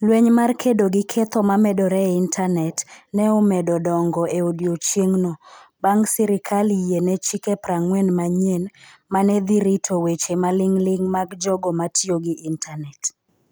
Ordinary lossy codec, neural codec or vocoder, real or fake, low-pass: none; none; real; none